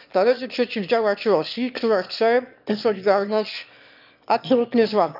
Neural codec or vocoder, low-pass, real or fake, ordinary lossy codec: autoencoder, 22.05 kHz, a latent of 192 numbers a frame, VITS, trained on one speaker; 5.4 kHz; fake; none